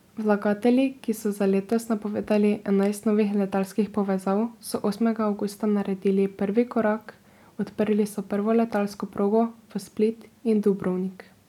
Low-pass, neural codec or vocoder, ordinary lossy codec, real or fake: 19.8 kHz; none; none; real